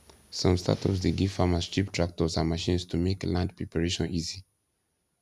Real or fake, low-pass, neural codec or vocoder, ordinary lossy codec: real; 14.4 kHz; none; none